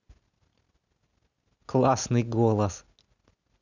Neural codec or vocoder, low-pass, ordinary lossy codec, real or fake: none; 7.2 kHz; none; real